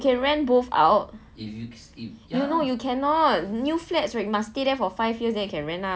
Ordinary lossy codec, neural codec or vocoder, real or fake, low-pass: none; none; real; none